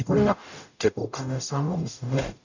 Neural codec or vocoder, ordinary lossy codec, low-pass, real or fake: codec, 44.1 kHz, 0.9 kbps, DAC; none; 7.2 kHz; fake